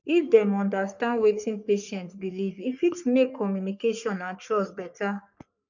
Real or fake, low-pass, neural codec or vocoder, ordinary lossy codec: fake; 7.2 kHz; codec, 44.1 kHz, 3.4 kbps, Pupu-Codec; none